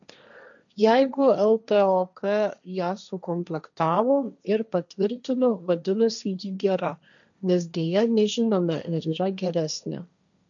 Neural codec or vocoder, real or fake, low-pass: codec, 16 kHz, 1.1 kbps, Voila-Tokenizer; fake; 7.2 kHz